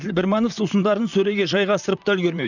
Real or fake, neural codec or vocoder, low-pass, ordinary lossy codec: fake; vocoder, 44.1 kHz, 128 mel bands, Pupu-Vocoder; 7.2 kHz; none